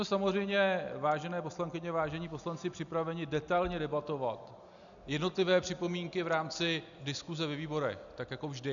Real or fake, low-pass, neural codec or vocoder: real; 7.2 kHz; none